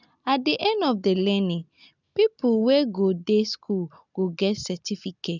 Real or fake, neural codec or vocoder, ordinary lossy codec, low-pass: real; none; none; 7.2 kHz